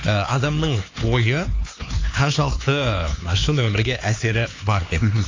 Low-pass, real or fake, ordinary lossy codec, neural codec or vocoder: 7.2 kHz; fake; AAC, 32 kbps; codec, 16 kHz, 4 kbps, X-Codec, HuBERT features, trained on LibriSpeech